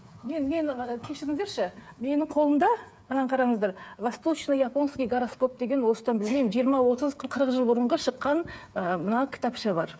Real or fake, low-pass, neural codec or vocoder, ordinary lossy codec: fake; none; codec, 16 kHz, 8 kbps, FreqCodec, smaller model; none